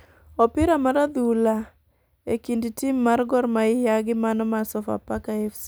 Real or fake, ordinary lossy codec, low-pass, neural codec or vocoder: real; none; none; none